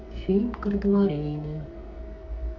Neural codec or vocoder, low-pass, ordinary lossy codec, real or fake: codec, 44.1 kHz, 2.6 kbps, SNAC; 7.2 kHz; none; fake